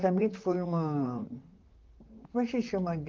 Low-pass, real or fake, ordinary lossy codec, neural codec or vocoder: 7.2 kHz; fake; Opus, 32 kbps; vocoder, 44.1 kHz, 128 mel bands, Pupu-Vocoder